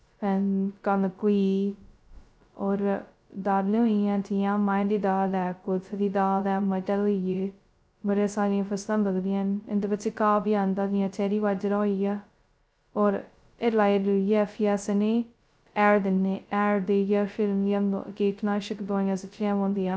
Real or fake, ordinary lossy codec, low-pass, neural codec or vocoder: fake; none; none; codec, 16 kHz, 0.2 kbps, FocalCodec